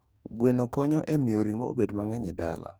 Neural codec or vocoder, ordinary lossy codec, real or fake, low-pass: codec, 44.1 kHz, 2.6 kbps, DAC; none; fake; none